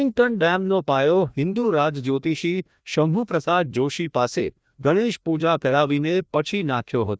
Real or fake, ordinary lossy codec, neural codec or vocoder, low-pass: fake; none; codec, 16 kHz, 1 kbps, FreqCodec, larger model; none